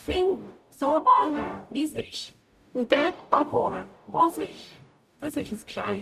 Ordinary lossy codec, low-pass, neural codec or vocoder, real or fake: none; 14.4 kHz; codec, 44.1 kHz, 0.9 kbps, DAC; fake